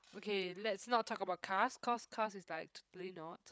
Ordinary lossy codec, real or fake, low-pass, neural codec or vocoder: none; fake; none; codec, 16 kHz, 8 kbps, FreqCodec, larger model